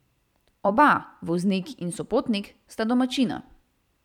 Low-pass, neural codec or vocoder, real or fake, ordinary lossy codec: 19.8 kHz; vocoder, 44.1 kHz, 128 mel bands every 256 samples, BigVGAN v2; fake; none